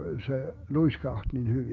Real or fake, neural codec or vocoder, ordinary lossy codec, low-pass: fake; codec, 16 kHz, 6 kbps, DAC; Opus, 32 kbps; 7.2 kHz